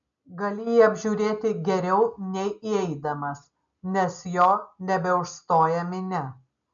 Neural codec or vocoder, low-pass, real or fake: none; 7.2 kHz; real